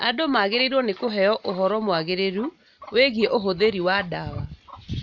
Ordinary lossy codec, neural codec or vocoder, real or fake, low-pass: none; none; real; 7.2 kHz